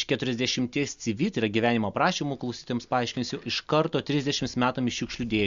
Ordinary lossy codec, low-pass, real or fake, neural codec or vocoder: Opus, 64 kbps; 7.2 kHz; real; none